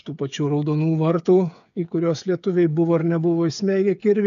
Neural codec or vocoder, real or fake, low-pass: codec, 16 kHz, 8 kbps, FreqCodec, smaller model; fake; 7.2 kHz